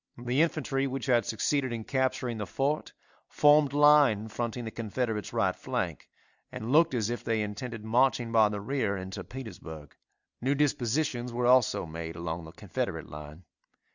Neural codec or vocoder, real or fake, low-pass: none; real; 7.2 kHz